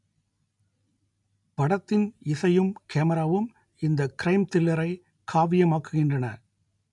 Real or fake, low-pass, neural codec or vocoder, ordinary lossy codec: real; 10.8 kHz; none; none